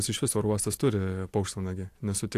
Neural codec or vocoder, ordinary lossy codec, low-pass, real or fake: none; AAC, 64 kbps; 14.4 kHz; real